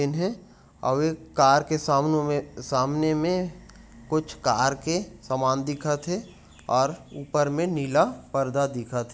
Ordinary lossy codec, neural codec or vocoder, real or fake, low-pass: none; none; real; none